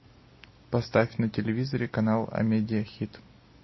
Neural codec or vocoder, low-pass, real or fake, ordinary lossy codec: none; 7.2 kHz; real; MP3, 24 kbps